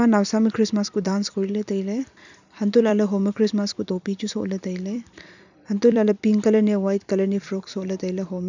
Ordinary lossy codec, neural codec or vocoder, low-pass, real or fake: none; vocoder, 44.1 kHz, 128 mel bands every 256 samples, BigVGAN v2; 7.2 kHz; fake